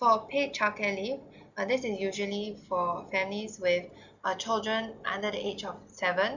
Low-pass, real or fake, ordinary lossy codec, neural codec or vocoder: 7.2 kHz; real; none; none